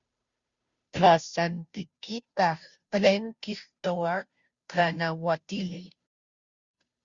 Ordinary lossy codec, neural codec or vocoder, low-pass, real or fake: Opus, 64 kbps; codec, 16 kHz, 0.5 kbps, FunCodec, trained on Chinese and English, 25 frames a second; 7.2 kHz; fake